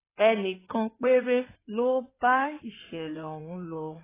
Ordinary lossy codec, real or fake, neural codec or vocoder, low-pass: AAC, 16 kbps; fake; codec, 16 kHz in and 24 kHz out, 2.2 kbps, FireRedTTS-2 codec; 3.6 kHz